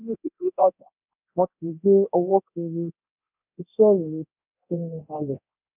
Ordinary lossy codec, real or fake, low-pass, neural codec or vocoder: none; fake; 3.6 kHz; codec, 24 kHz, 0.9 kbps, DualCodec